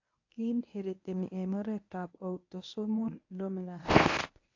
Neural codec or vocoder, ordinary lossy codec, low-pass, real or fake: codec, 24 kHz, 0.9 kbps, WavTokenizer, medium speech release version 1; AAC, 48 kbps; 7.2 kHz; fake